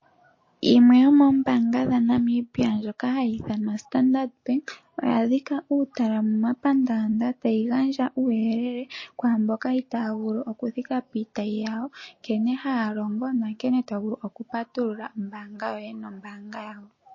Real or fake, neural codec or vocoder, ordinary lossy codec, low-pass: real; none; MP3, 32 kbps; 7.2 kHz